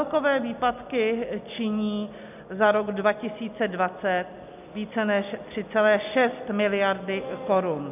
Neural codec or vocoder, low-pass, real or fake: none; 3.6 kHz; real